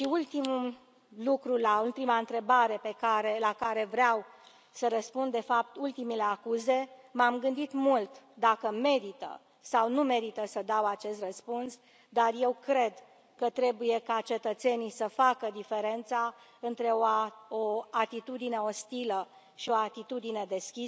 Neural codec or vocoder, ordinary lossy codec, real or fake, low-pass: none; none; real; none